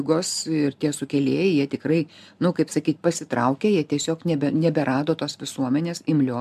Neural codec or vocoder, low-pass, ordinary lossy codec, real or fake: none; 14.4 kHz; MP3, 96 kbps; real